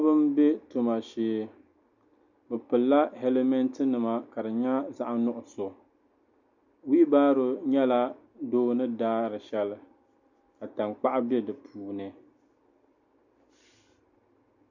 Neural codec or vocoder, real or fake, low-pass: none; real; 7.2 kHz